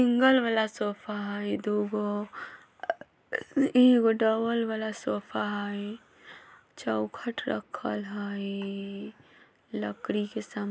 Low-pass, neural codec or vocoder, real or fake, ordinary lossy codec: none; none; real; none